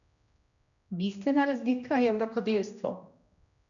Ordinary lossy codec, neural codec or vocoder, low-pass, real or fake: none; codec, 16 kHz, 1 kbps, X-Codec, HuBERT features, trained on general audio; 7.2 kHz; fake